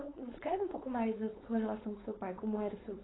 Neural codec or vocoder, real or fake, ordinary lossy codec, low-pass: codec, 16 kHz, 4.8 kbps, FACodec; fake; AAC, 16 kbps; 7.2 kHz